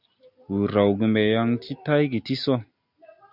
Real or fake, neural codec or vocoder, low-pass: real; none; 5.4 kHz